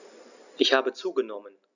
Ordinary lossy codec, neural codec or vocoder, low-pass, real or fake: none; none; 7.2 kHz; real